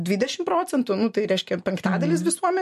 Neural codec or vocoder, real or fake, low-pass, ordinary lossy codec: none; real; 14.4 kHz; MP3, 64 kbps